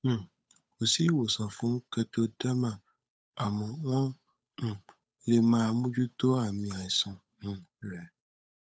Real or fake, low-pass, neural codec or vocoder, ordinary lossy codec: fake; none; codec, 16 kHz, 8 kbps, FunCodec, trained on Chinese and English, 25 frames a second; none